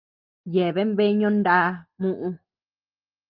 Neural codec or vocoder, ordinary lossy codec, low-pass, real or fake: none; Opus, 24 kbps; 5.4 kHz; real